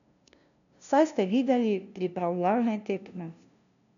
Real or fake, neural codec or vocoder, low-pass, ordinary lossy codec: fake; codec, 16 kHz, 0.5 kbps, FunCodec, trained on LibriTTS, 25 frames a second; 7.2 kHz; none